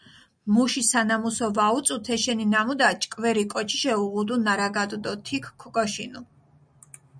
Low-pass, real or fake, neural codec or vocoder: 9.9 kHz; real; none